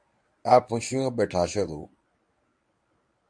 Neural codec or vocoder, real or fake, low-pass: codec, 24 kHz, 0.9 kbps, WavTokenizer, medium speech release version 1; fake; 9.9 kHz